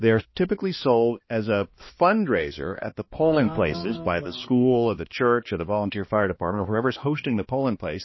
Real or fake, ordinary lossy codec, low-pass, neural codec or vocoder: fake; MP3, 24 kbps; 7.2 kHz; codec, 16 kHz, 2 kbps, X-Codec, HuBERT features, trained on balanced general audio